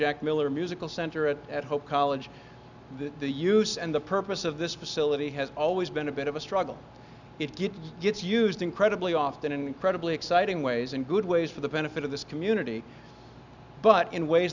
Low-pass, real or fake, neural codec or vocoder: 7.2 kHz; real; none